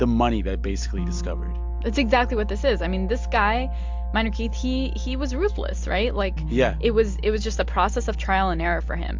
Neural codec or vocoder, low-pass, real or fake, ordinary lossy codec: none; 7.2 kHz; real; MP3, 64 kbps